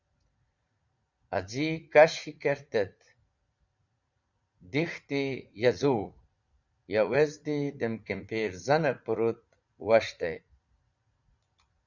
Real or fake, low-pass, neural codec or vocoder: fake; 7.2 kHz; vocoder, 44.1 kHz, 80 mel bands, Vocos